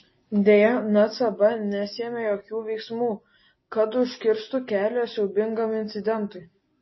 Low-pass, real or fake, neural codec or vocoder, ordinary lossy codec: 7.2 kHz; real; none; MP3, 24 kbps